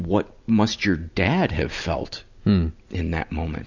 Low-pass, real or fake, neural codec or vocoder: 7.2 kHz; real; none